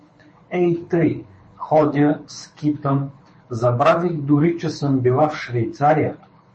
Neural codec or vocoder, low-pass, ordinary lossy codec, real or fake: codec, 24 kHz, 6 kbps, HILCodec; 9.9 kHz; MP3, 32 kbps; fake